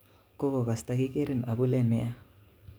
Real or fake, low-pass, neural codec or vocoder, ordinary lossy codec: fake; none; codec, 44.1 kHz, 7.8 kbps, DAC; none